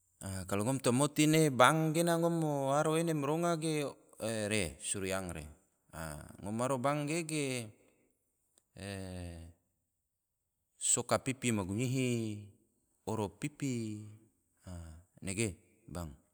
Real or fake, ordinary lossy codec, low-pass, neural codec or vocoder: real; none; none; none